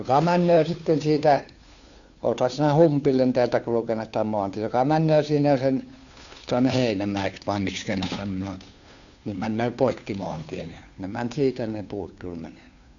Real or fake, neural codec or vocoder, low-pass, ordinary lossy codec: fake; codec, 16 kHz, 2 kbps, FunCodec, trained on Chinese and English, 25 frames a second; 7.2 kHz; none